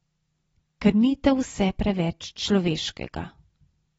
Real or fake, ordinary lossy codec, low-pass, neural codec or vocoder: real; AAC, 24 kbps; 19.8 kHz; none